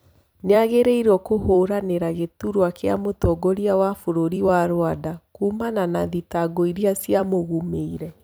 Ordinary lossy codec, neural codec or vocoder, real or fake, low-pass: none; vocoder, 44.1 kHz, 128 mel bands every 256 samples, BigVGAN v2; fake; none